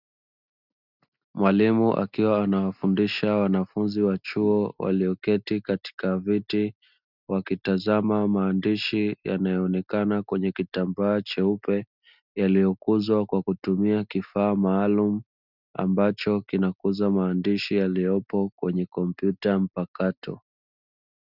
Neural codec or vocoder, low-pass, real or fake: none; 5.4 kHz; real